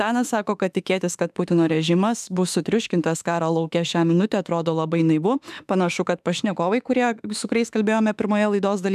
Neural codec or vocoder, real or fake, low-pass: autoencoder, 48 kHz, 32 numbers a frame, DAC-VAE, trained on Japanese speech; fake; 14.4 kHz